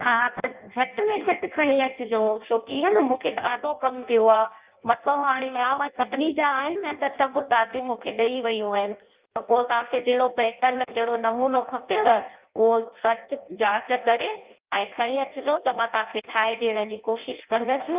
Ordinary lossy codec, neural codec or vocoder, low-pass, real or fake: Opus, 24 kbps; codec, 16 kHz in and 24 kHz out, 0.6 kbps, FireRedTTS-2 codec; 3.6 kHz; fake